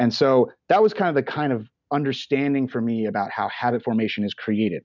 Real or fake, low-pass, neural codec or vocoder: real; 7.2 kHz; none